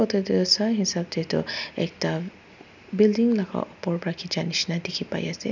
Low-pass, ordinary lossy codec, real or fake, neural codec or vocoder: 7.2 kHz; none; real; none